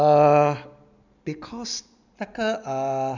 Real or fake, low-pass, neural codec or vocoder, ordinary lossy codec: real; 7.2 kHz; none; none